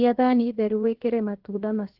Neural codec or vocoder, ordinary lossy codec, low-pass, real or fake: codec, 16 kHz, 0.7 kbps, FocalCodec; Opus, 16 kbps; 5.4 kHz; fake